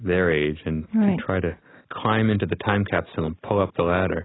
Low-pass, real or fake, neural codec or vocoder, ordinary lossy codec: 7.2 kHz; real; none; AAC, 16 kbps